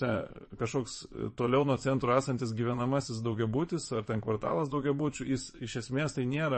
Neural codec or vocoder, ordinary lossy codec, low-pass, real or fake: vocoder, 22.05 kHz, 80 mel bands, Vocos; MP3, 32 kbps; 9.9 kHz; fake